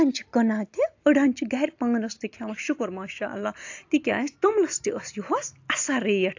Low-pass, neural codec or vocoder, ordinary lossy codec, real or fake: 7.2 kHz; none; AAC, 48 kbps; real